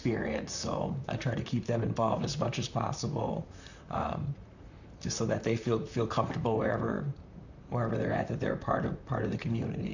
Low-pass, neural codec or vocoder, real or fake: 7.2 kHz; vocoder, 44.1 kHz, 128 mel bands, Pupu-Vocoder; fake